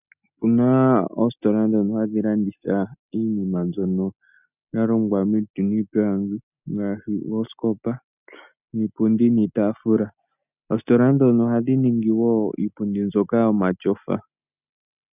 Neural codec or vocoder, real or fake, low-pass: none; real; 3.6 kHz